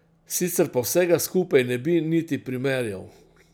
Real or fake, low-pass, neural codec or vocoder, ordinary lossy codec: real; none; none; none